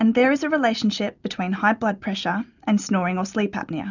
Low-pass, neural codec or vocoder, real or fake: 7.2 kHz; none; real